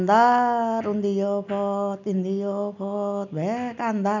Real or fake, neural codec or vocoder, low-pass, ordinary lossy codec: real; none; 7.2 kHz; none